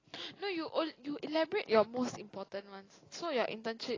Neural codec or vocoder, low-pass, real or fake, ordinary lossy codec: none; 7.2 kHz; real; AAC, 32 kbps